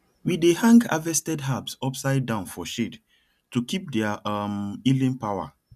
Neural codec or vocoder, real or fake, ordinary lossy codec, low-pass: none; real; none; 14.4 kHz